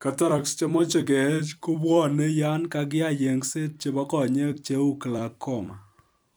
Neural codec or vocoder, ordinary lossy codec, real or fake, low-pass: vocoder, 44.1 kHz, 128 mel bands every 256 samples, BigVGAN v2; none; fake; none